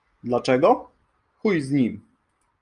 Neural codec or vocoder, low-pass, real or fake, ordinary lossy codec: none; 10.8 kHz; real; Opus, 32 kbps